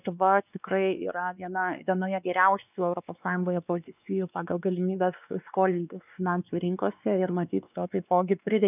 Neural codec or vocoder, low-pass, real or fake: codec, 16 kHz, 2 kbps, X-Codec, WavLM features, trained on Multilingual LibriSpeech; 3.6 kHz; fake